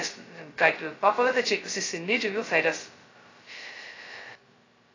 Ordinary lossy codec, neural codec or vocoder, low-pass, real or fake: AAC, 32 kbps; codec, 16 kHz, 0.2 kbps, FocalCodec; 7.2 kHz; fake